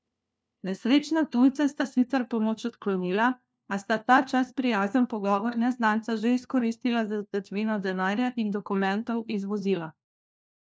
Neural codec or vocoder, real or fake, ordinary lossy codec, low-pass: codec, 16 kHz, 1 kbps, FunCodec, trained on LibriTTS, 50 frames a second; fake; none; none